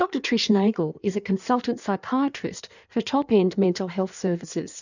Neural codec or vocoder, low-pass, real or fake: codec, 16 kHz in and 24 kHz out, 1.1 kbps, FireRedTTS-2 codec; 7.2 kHz; fake